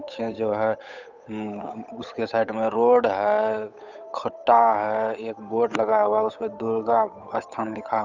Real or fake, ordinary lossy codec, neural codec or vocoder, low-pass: fake; none; codec, 16 kHz, 8 kbps, FunCodec, trained on Chinese and English, 25 frames a second; 7.2 kHz